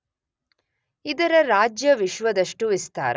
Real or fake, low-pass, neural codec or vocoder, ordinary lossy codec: real; none; none; none